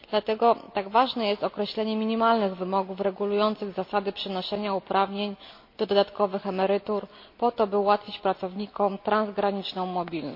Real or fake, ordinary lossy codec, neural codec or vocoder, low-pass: real; MP3, 32 kbps; none; 5.4 kHz